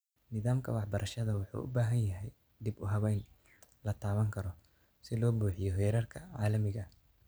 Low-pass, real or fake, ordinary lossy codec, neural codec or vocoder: none; real; none; none